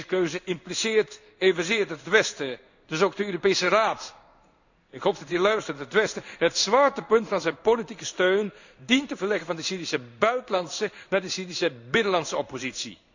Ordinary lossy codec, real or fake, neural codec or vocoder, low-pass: none; fake; codec, 16 kHz in and 24 kHz out, 1 kbps, XY-Tokenizer; 7.2 kHz